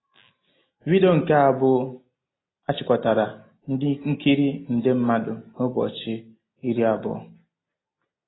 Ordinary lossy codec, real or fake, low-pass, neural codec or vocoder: AAC, 16 kbps; real; 7.2 kHz; none